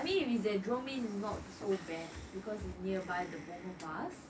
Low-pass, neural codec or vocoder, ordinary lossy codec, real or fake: none; none; none; real